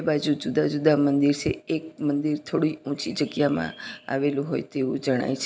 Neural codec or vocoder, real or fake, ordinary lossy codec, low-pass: none; real; none; none